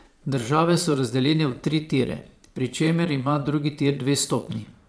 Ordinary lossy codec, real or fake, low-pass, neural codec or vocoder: none; fake; none; vocoder, 22.05 kHz, 80 mel bands, Vocos